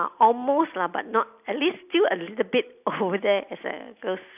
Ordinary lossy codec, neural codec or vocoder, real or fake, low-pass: none; none; real; 3.6 kHz